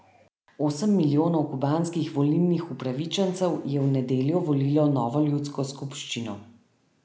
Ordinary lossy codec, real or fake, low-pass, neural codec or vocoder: none; real; none; none